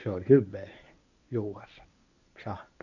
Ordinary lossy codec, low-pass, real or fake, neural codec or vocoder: none; 7.2 kHz; fake; codec, 24 kHz, 0.9 kbps, WavTokenizer, medium speech release version 1